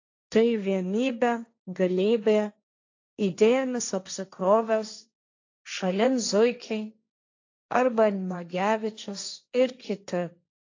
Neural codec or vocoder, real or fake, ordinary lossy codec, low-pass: codec, 16 kHz, 1.1 kbps, Voila-Tokenizer; fake; AAC, 48 kbps; 7.2 kHz